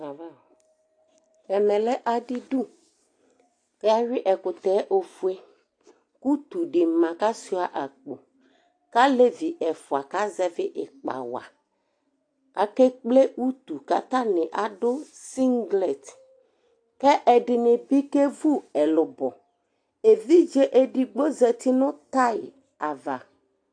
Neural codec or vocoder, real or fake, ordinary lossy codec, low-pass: none; real; AAC, 48 kbps; 9.9 kHz